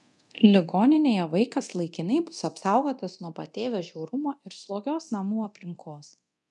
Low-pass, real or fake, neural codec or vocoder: 10.8 kHz; fake; codec, 24 kHz, 0.9 kbps, DualCodec